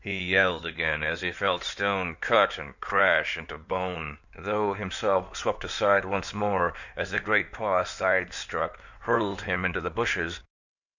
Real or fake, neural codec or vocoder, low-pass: fake; codec, 16 kHz in and 24 kHz out, 2.2 kbps, FireRedTTS-2 codec; 7.2 kHz